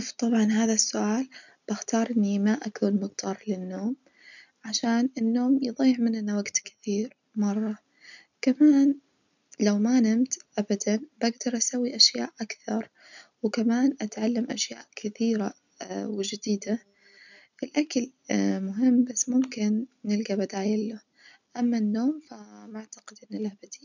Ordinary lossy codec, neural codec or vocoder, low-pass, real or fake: none; none; 7.2 kHz; real